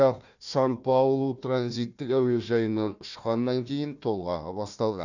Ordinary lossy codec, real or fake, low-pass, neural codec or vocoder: none; fake; 7.2 kHz; codec, 16 kHz, 1 kbps, FunCodec, trained on Chinese and English, 50 frames a second